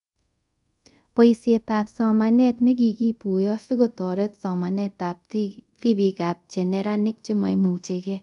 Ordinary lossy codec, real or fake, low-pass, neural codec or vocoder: none; fake; 10.8 kHz; codec, 24 kHz, 0.5 kbps, DualCodec